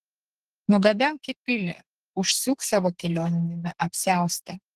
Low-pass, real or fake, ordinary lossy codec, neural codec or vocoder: 14.4 kHz; fake; Opus, 16 kbps; codec, 32 kHz, 1.9 kbps, SNAC